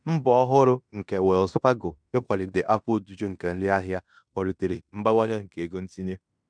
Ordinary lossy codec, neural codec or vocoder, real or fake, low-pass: MP3, 96 kbps; codec, 16 kHz in and 24 kHz out, 0.9 kbps, LongCat-Audio-Codec, fine tuned four codebook decoder; fake; 9.9 kHz